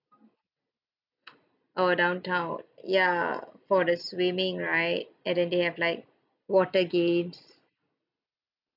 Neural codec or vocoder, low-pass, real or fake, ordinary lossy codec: none; 5.4 kHz; real; none